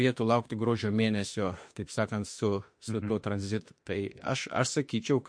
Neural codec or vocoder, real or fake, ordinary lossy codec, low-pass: autoencoder, 48 kHz, 32 numbers a frame, DAC-VAE, trained on Japanese speech; fake; MP3, 48 kbps; 9.9 kHz